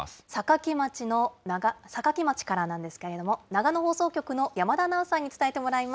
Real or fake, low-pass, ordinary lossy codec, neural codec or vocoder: real; none; none; none